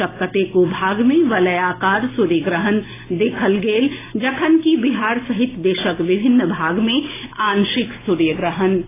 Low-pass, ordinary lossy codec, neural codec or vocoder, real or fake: 3.6 kHz; AAC, 16 kbps; none; real